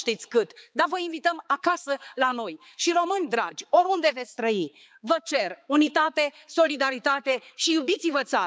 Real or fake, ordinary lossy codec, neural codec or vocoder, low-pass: fake; none; codec, 16 kHz, 4 kbps, X-Codec, HuBERT features, trained on balanced general audio; none